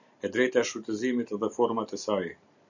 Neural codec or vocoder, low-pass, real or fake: none; 7.2 kHz; real